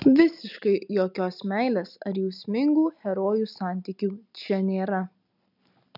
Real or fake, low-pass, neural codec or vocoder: real; 5.4 kHz; none